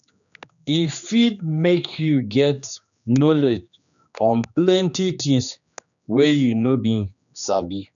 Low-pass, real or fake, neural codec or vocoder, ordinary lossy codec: 7.2 kHz; fake; codec, 16 kHz, 2 kbps, X-Codec, HuBERT features, trained on general audio; none